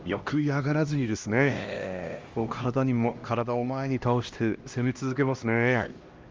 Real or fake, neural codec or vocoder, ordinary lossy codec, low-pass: fake; codec, 16 kHz, 1 kbps, X-Codec, HuBERT features, trained on LibriSpeech; Opus, 32 kbps; 7.2 kHz